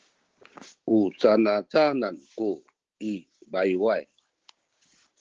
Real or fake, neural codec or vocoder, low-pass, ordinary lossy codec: fake; codec, 16 kHz, 6 kbps, DAC; 7.2 kHz; Opus, 16 kbps